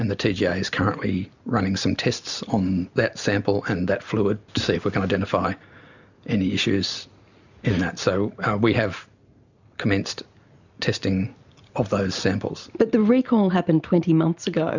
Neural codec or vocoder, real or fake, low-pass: none; real; 7.2 kHz